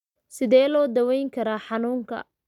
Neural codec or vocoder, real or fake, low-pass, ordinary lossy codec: none; real; 19.8 kHz; none